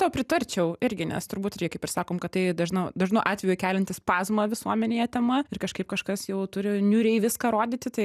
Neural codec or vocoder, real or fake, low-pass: none; real; 14.4 kHz